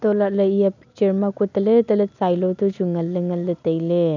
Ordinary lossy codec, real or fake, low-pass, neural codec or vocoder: none; real; 7.2 kHz; none